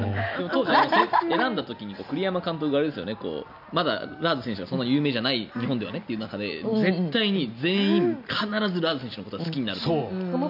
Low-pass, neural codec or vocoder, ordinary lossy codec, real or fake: 5.4 kHz; none; none; real